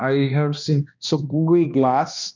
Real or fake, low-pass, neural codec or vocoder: fake; 7.2 kHz; codec, 16 kHz, 1 kbps, X-Codec, HuBERT features, trained on balanced general audio